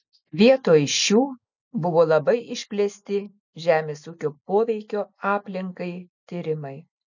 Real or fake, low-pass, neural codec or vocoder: real; 7.2 kHz; none